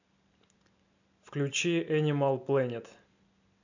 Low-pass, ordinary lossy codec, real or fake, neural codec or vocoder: 7.2 kHz; none; real; none